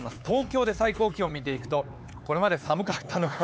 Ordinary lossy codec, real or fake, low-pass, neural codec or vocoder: none; fake; none; codec, 16 kHz, 4 kbps, X-Codec, HuBERT features, trained on LibriSpeech